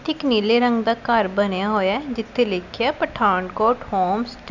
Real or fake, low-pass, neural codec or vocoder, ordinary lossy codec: real; 7.2 kHz; none; none